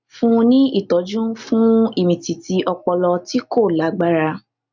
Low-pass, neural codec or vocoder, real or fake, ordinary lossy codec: 7.2 kHz; none; real; none